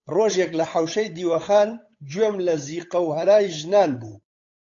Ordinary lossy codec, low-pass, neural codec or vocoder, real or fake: AAC, 48 kbps; 7.2 kHz; codec, 16 kHz, 8 kbps, FunCodec, trained on Chinese and English, 25 frames a second; fake